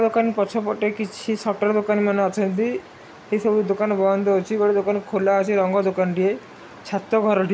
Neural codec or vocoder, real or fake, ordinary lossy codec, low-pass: none; real; none; none